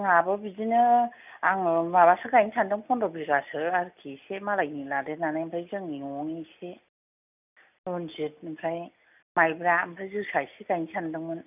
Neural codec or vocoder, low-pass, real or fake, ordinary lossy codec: none; 3.6 kHz; real; none